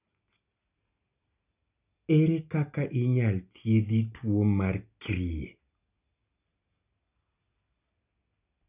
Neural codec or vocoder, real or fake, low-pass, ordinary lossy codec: none; real; 3.6 kHz; none